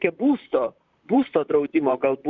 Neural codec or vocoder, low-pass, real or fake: vocoder, 44.1 kHz, 128 mel bands, Pupu-Vocoder; 7.2 kHz; fake